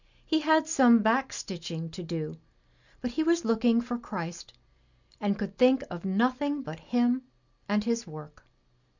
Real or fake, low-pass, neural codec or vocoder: real; 7.2 kHz; none